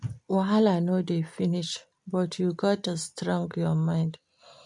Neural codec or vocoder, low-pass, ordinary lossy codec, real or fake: none; 10.8 kHz; MP3, 64 kbps; real